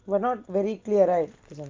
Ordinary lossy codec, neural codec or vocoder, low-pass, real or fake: Opus, 32 kbps; none; 7.2 kHz; real